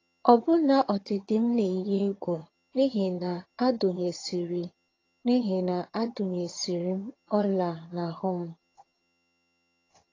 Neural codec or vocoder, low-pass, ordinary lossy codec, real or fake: vocoder, 22.05 kHz, 80 mel bands, HiFi-GAN; 7.2 kHz; AAC, 32 kbps; fake